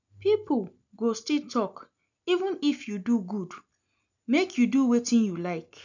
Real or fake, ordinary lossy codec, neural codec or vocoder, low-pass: real; none; none; 7.2 kHz